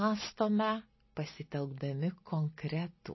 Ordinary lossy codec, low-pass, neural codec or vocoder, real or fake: MP3, 24 kbps; 7.2 kHz; none; real